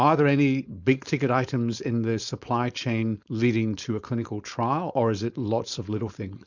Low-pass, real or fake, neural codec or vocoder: 7.2 kHz; fake; codec, 16 kHz, 4.8 kbps, FACodec